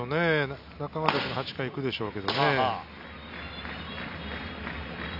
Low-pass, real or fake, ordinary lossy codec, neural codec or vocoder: 5.4 kHz; real; none; none